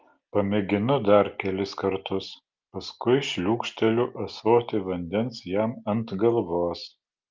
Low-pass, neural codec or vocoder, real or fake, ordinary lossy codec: 7.2 kHz; none; real; Opus, 24 kbps